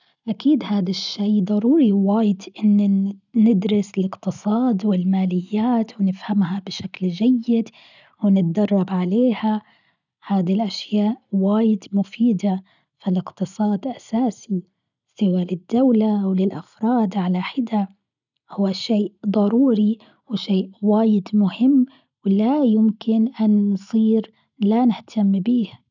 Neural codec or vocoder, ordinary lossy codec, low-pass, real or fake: none; none; 7.2 kHz; real